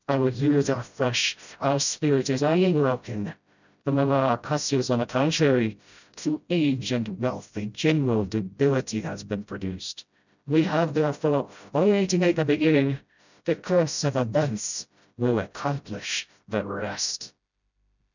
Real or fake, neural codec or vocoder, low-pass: fake; codec, 16 kHz, 0.5 kbps, FreqCodec, smaller model; 7.2 kHz